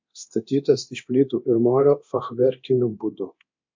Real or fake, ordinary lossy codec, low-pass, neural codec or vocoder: fake; MP3, 48 kbps; 7.2 kHz; codec, 24 kHz, 0.9 kbps, DualCodec